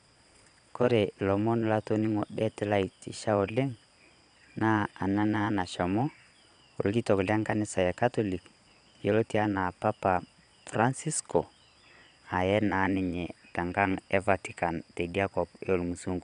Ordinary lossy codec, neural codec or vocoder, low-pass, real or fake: none; vocoder, 22.05 kHz, 80 mel bands, Vocos; 9.9 kHz; fake